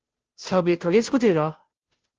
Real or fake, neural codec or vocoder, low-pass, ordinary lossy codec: fake; codec, 16 kHz, 0.5 kbps, FunCodec, trained on Chinese and English, 25 frames a second; 7.2 kHz; Opus, 16 kbps